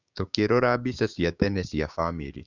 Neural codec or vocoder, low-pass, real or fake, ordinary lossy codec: codec, 16 kHz, 6 kbps, DAC; 7.2 kHz; fake; none